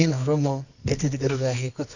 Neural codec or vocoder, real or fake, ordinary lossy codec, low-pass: codec, 24 kHz, 0.9 kbps, WavTokenizer, medium music audio release; fake; none; 7.2 kHz